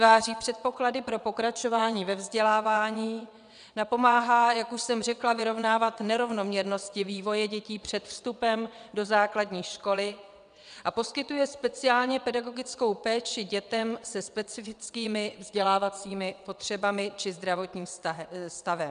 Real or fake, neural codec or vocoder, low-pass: fake; vocoder, 22.05 kHz, 80 mel bands, WaveNeXt; 9.9 kHz